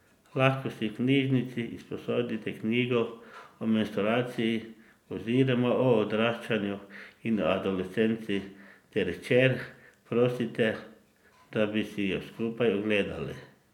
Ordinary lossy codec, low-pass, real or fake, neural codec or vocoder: none; 19.8 kHz; real; none